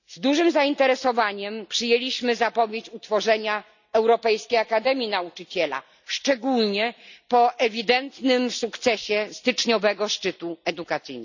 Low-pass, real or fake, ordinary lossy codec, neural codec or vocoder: 7.2 kHz; real; none; none